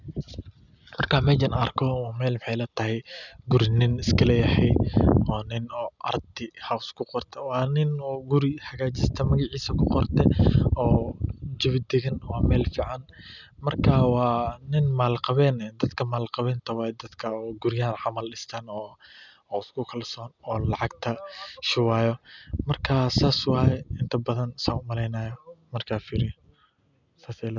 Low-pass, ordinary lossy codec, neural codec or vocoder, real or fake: 7.2 kHz; none; none; real